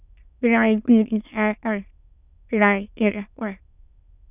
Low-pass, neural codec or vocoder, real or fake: 3.6 kHz; autoencoder, 22.05 kHz, a latent of 192 numbers a frame, VITS, trained on many speakers; fake